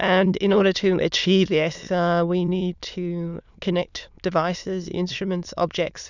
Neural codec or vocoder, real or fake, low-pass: autoencoder, 22.05 kHz, a latent of 192 numbers a frame, VITS, trained on many speakers; fake; 7.2 kHz